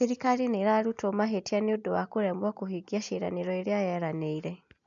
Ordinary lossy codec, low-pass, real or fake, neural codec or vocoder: MP3, 64 kbps; 7.2 kHz; real; none